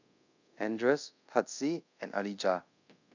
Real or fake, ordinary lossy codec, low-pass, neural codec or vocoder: fake; none; 7.2 kHz; codec, 24 kHz, 0.5 kbps, DualCodec